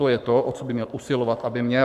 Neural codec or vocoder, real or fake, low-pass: codec, 44.1 kHz, 7.8 kbps, Pupu-Codec; fake; 14.4 kHz